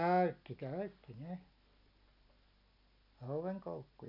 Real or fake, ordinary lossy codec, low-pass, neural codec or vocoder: real; none; 5.4 kHz; none